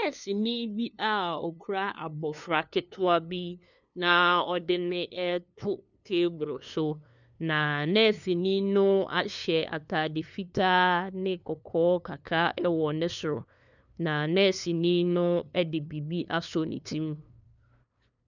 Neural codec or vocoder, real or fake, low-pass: codec, 16 kHz, 2 kbps, FunCodec, trained on LibriTTS, 25 frames a second; fake; 7.2 kHz